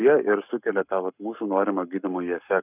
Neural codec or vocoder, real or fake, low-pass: codec, 44.1 kHz, 7.8 kbps, Pupu-Codec; fake; 3.6 kHz